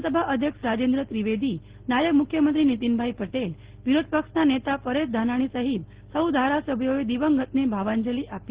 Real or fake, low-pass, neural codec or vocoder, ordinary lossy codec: real; 3.6 kHz; none; Opus, 16 kbps